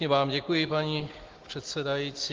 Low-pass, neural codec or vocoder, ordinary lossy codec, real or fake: 7.2 kHz; none; Opus, 16 kbps; real